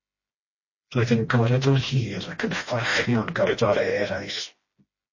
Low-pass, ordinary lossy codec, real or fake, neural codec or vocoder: 7.2 kHz; MP3, 32 kbps; fake; codec, 16 kHz, 1 kbps, FreqCodec, smaller model